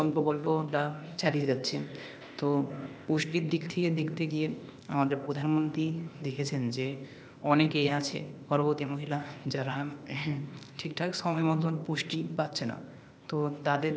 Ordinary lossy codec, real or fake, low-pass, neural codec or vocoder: none; fake; none; codec, 16 kHz, 0.8 kbps, ZipCodec